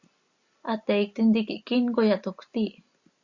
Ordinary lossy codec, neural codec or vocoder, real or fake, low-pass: Opus, 64 kbps; none; real; 7.2 kHz